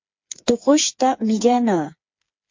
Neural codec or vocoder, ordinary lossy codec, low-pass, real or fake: codec, 16 kHz, 4 kbps, FreqCodec, smaller model; MP3, 48 kbps; 7.2 kHz; fake